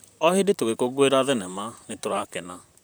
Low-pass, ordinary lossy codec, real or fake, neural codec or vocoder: none; none; fake; vocoder, 44.1 kHz, 128 mel bands, Pupu-Vocoder